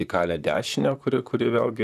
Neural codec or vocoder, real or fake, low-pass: vocoder, 44.1 kHz, 128 mel bands, Pupu-Vocoder; fake; 14.4 kHz